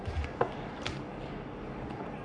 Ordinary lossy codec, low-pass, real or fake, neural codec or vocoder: none; 9.9 kHz; fake; codec, 44.1 kHz, 3.4 kbps, Pupu-Codec